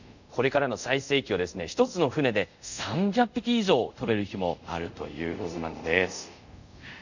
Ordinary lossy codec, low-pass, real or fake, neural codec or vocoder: none; 7.2 kHz; fake; codec, 24 kHz, 0.5 kbps, DualCodec